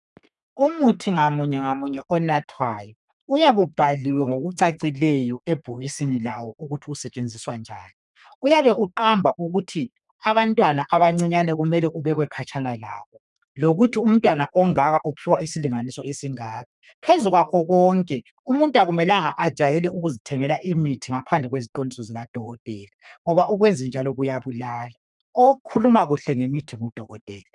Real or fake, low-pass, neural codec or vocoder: fake; 10.8 kHz; codec, 32 kHz, 1.9 kbps, SNAC